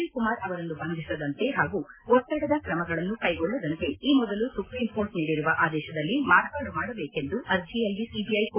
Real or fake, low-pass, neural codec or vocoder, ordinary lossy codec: real; 3.6 kHz; none; MP3, 16 kbps